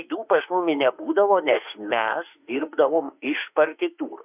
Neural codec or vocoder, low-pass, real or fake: autoencoder, 48 kHz, 32 numbers a frame, DAC-VAE, trained on Japanese speech; 3.6 kHz; fake